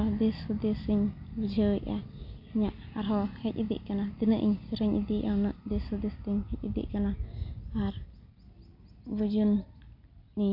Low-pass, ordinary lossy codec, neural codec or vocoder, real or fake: 5.4 kHz; none; none; real